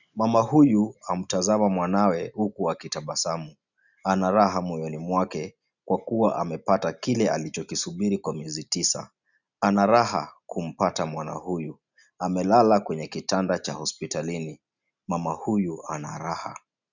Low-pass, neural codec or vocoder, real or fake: 7.2 kHz; none; real